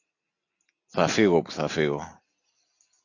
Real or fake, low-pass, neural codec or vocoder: real; 7.2 kHz; none